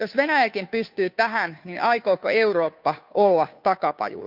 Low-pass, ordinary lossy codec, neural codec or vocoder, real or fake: 5.4 kHz; none; codec, 16 kHz, 2 kbps, FunCodec, trained on Chinese and English, 25 frames a second; fake